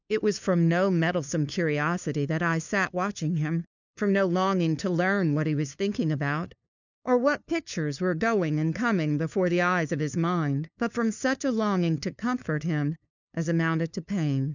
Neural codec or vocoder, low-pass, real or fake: codec, 16 kHz, 2 kbps, FunCodec, trained on LibriTTS, 25 frames a second; 7.2 kHz; fake